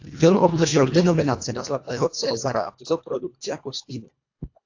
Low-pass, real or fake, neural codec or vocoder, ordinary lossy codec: 7.2 kHz; fake; codec, 24 kHz, 1.5 kbps, HILCodec; AAC, 48 kbps